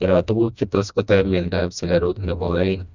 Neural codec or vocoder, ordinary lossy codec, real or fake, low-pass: codec, 16 kHz, 1 kbps, FreqCodec, smaller model; none; fake; 7.2 kHz